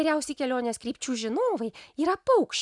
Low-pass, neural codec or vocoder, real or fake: 10.8 kHz; none; real